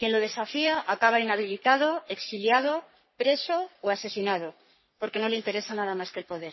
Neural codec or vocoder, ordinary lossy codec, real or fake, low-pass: codec, 44.1 kHz, 3.4 kbps, Pupu-Codec; MP3, 24 kbps; fake; 7.2 kHz